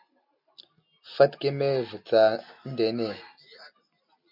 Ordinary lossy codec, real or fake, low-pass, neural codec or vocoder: MP3, 48 kbps; real; 5.4 kHz; none